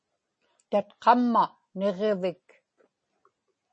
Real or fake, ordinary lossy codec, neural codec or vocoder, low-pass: real; MP3, 32 kbps; none; 9.9 kHz